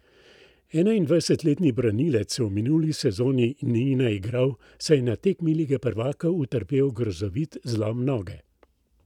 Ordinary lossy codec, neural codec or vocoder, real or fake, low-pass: none; none; real; 19.8 kHz